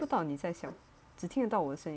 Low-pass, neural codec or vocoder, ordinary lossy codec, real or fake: none; none; none; real